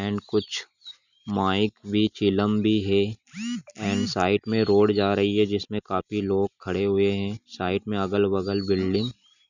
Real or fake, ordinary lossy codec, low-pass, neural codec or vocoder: real; none; 7.2 kHz; none